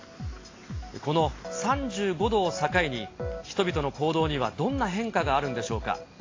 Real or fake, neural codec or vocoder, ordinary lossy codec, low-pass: real; none; AAC, 32 kbps; 7.2 kHz